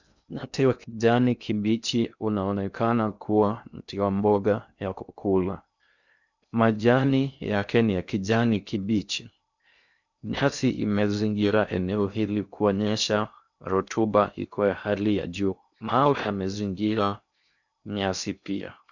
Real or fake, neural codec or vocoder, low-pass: fake; codec, 16 kHz in and 24 kHz out, 0.6 kbps, FocalCodec, streaming, 2048 codes; 7.2 kHz